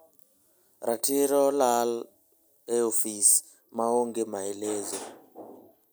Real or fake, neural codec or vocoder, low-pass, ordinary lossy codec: real; none; none; none